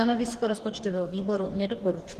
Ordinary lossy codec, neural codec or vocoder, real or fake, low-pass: Opus, 16 kbps; codec, 44.1 kHz, 2.6 kbps, DAC; fake; 14.4 kHz